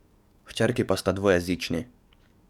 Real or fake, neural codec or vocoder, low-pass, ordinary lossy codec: fake; codec, 44.1 kHz, 7.8 kbps, DAC; 19.8 kHz; none